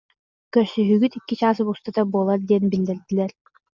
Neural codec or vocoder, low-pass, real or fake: none; 7.2 kHz; real